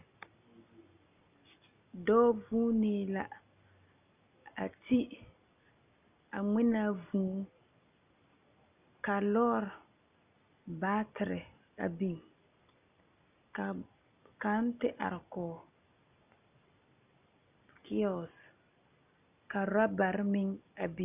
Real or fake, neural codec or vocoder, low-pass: real; none; 3.6 kHz